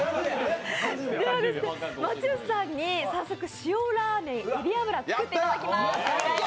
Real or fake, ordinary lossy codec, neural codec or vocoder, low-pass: real; none; none; none